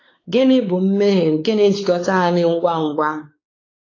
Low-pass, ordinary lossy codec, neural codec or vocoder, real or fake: 7.2 kHz; AAC, 32 kbps; codec, 16 kHz, 4 kbps, X-Codec, WavLM features, trained on Multilingual LibriSpeech; fake